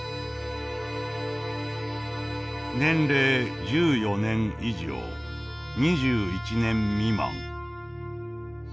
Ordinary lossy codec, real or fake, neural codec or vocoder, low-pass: none; real; none; none